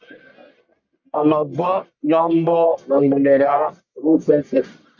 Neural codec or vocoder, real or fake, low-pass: codec, 44.1 kHz, 1.7 kbps, Pupu-Codec; fake; 7.2 kHz